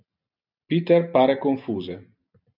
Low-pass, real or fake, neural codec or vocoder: 5.4 kHz; real; none